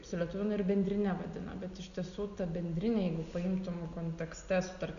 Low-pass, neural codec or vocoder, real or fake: 7.2 kHz; none; real